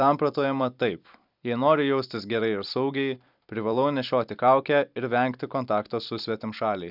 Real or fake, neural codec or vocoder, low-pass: fake; vocoder, 44.1 kHz, 128 mel bands every 256 samples, BigVGAN v2; 5.4 kHz